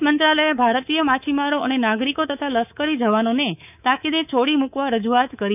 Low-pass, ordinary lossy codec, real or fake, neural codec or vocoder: 3.6 kHz; none; fake; codec, 24 kHz, 3.1 kbps, DualCodec